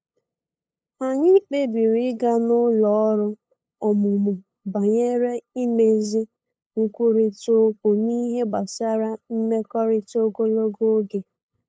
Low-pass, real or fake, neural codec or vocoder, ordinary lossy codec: none; fake; codec, 16 kHz, 8 kbps, FunCodec, trained on LibriTTS, 25 frames a second; none